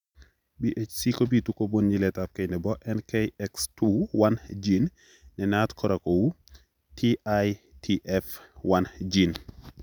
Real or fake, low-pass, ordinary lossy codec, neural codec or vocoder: real; 19.8 kHz; none; none